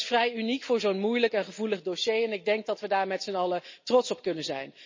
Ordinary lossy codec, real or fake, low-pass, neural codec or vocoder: MP3, 32 kbps; real; 7.2 kHz; none